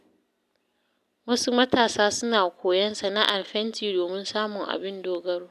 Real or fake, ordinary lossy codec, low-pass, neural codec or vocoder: real; none; 14.4 kHz; none